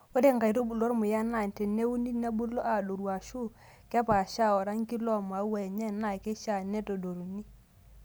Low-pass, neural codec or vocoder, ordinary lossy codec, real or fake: none; none; none; real